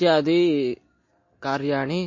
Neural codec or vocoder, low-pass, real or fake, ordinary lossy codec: none; 7.2 kHz; real; MP3, 32 kbps